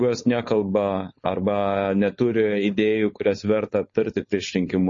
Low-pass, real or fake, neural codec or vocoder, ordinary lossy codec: 7.2 kHz; fake; codec, 16 kHz, 4.8 kbps, FACodec; MP3, 32 kbps